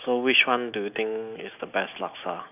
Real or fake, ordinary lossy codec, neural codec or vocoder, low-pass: real; none; none; 3.6 kHz